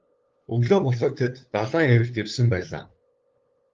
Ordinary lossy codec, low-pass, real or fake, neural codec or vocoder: Opus, 32 kbps; 7.2 kHz; fake; codec, 16 kHz, 2 kbps, FunCodec, trained on LibriTTS, 25 frames a second